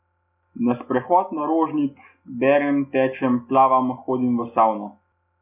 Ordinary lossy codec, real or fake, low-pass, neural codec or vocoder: none; real; 3.6 kHz; none